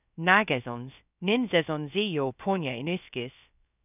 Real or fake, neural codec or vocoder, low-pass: fake; codec, 16 kHz, 0.3 kbps, FocalCodec; 3.6 kHz